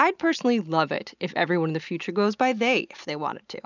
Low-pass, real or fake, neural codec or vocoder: 7.2 kHz; real; none